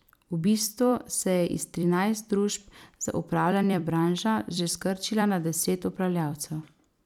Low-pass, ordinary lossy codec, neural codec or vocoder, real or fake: 19.8 kHz; none; vocoder, 44.1 kHz, 128 mel bands every 256 samples, BigVGAN v2; fake